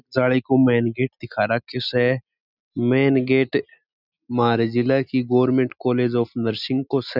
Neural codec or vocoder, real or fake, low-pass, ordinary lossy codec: none; real; 5.4 kHz; none